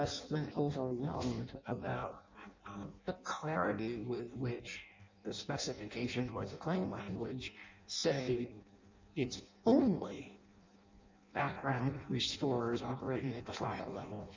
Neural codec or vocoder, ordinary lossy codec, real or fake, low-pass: codec, 16 kHz in and 24 kHz out, 0.6 kbps, FireRedTTS-2 codec; MP3, 64 kbps; fake; 7.2 kHz